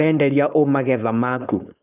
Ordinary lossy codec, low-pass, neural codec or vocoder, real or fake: none; 3.6 kHz; codec, 16 kHz, 4.8 kbps, FACodec; fake